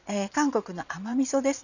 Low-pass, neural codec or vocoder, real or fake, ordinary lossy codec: 7.2 kHz; vocoder, 22.05 kHz, 80 mel bands, Vocos; fake; none